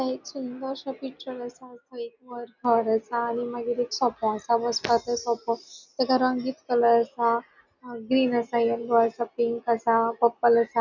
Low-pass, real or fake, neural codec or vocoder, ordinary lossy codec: 7.2 kHz; real; none; none